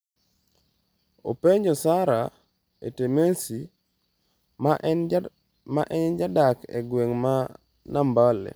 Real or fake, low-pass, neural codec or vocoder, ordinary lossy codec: real; none; none; none